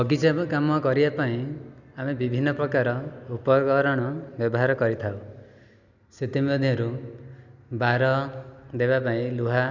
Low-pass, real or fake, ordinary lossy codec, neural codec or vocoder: 7.2 kHz; real; none; none